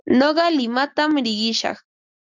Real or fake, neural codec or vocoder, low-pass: real; none; 7.2 kHz